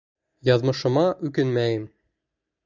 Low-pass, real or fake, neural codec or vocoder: 7.2 kHz; real; none